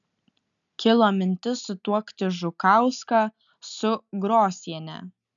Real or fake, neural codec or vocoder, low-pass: real; none; 7.2 kHz